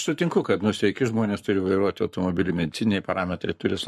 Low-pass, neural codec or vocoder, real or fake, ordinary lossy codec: 14.4 kHz; codec, 44.1 kHz, 7.8 kbps, Pupu-Codec; fake; MP3, 64 kbps